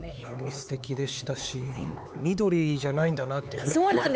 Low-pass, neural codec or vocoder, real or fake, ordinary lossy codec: none; codec, 16 kHz, 4 kbps, X-Codec, HuBERT features, trained on LibriSpeech; fake; none